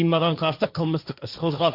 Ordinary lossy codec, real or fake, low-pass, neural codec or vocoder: Opus, 64 kbps; fake; 5.4 kHz; codec, 16 kHz, 1.1 kbps, Voila-Tokenizer